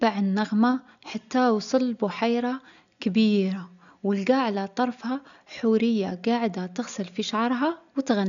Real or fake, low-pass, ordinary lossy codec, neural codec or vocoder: real; 7.2 kHz; none; none